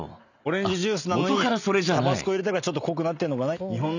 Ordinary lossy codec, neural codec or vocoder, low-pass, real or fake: none; none; 7.2 kHz; real